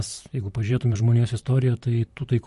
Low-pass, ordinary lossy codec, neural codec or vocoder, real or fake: 14.4 kHz; MP3, 48 kbps; vocoder, 44.1 kHz, 128 mel bands, Pupu-Vocoder; fake